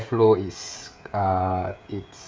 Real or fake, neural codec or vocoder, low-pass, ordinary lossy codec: fake; codec, 16 kHz, 16 kbps, FreqCodec, smaller model; none; none